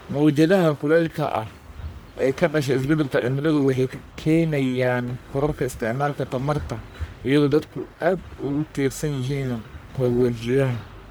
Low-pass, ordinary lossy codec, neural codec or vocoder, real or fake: none; none; codec, 44.1 kHz, 1.7 kbps, Pupu-Codec; fake